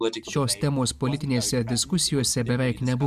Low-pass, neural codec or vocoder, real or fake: 14.4 kHz; none; real